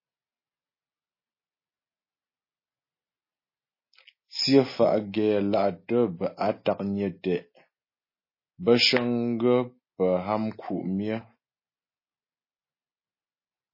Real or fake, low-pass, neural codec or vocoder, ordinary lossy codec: real; 5.4 kHz; none; MP3, 24 kbps